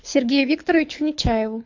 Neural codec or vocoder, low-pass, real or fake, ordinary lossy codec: codec, 24 kHz, 6 kbps, HILCodec; 7.2 kHz; fake; AAC, 48 kbps